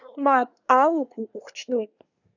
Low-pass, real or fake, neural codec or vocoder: 7.2 kHz; fake; codec, 24 kHz, 1 kbps, SNAC